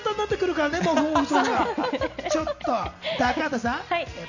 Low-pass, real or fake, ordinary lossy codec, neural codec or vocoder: 7.2 kHz; real; none; none